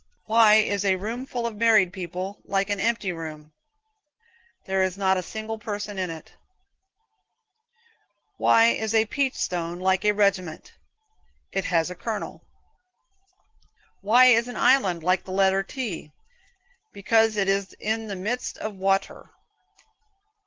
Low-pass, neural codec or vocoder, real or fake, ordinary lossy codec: 7.2 kHz; none; real; Opus, 16 kbps